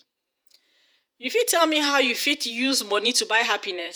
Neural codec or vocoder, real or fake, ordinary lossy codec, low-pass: vocoder, 48 kHz, 128 mel bands, Vocos; fake; none; none